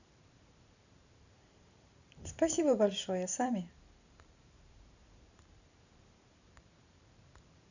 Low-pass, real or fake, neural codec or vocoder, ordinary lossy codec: 7.2 kHz; fake; vocoder, 44.1 kHz, 128 mel bands every 512 samples, BigVGAN v2; none